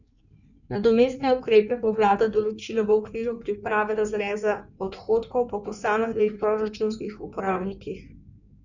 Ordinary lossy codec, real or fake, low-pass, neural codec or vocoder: none; fake; 7.2 kHz; codec, 16 kHz in and 24 kHz out, 1.1 kbps, FireRedTTS-2 codec